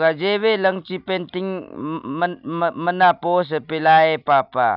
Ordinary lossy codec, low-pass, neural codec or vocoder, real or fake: none; 5.4 kHz; none; real